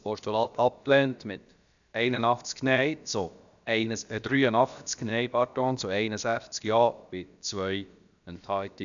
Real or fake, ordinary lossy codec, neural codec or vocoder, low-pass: fake; none; codec, 16 kHz, about 1 kbps, DyCAST, with the encoder's durations; 7.2 kHz